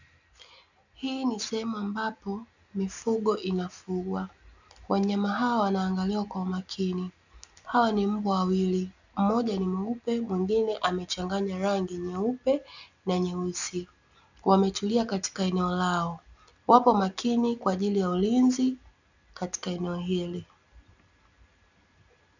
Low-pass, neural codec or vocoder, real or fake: 7.2 kHz; none; real